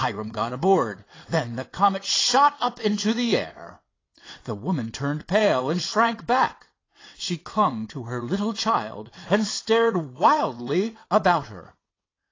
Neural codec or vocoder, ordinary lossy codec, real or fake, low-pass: vocoder, 22.05 kHz, 80 mel bands, WaveNeXt; AAC, 32 kbps; fake; 7.2 kHz